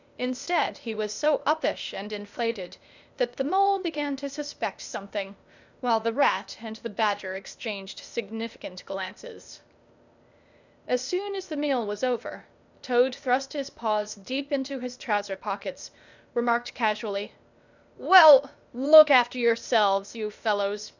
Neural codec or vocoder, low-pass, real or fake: codec, 16 kHz, 0.8 kbps, ZipCodec; 7.2 kHz; fake